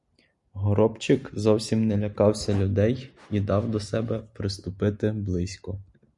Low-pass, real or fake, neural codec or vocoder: 10.8 kHz; real; none